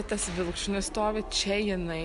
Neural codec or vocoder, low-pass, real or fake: none; 10.8 kHz; real